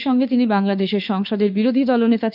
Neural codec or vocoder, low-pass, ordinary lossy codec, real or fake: autoencoder, 48 kHz, 32 numbers a frame, DAC-VAE, trained on Japanese speech; 5.4 kHz; none; fake